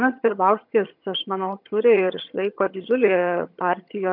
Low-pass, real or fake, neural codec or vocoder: 5.4 kHz; fake; vocoder, 22.05 kHz, 80 mel bands, HiFi-GAN